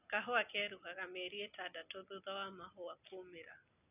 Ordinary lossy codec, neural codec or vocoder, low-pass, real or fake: none; none; 3.6 kHz; real